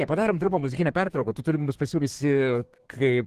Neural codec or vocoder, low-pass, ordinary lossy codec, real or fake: codec, 32 kHz, 1.9 kbps, SNAC; 14.4 kHz; Opus, 16 kbps; fake